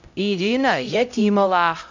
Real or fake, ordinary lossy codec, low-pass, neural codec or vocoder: fake; MP3, 64 kbps; 7.2 kHz; codec, 16 kHz, 0.5 kbps, X-Codec, HuBERT features, trained on LibriSpeech